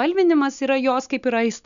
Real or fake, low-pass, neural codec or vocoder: real; 7.2 kHz; none